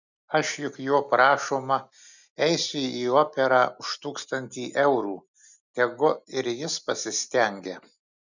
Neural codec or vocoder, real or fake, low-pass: none; real; 7.2 kHz